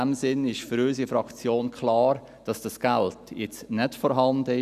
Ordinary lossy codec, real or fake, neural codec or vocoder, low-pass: none; real; none; 14.4 kHz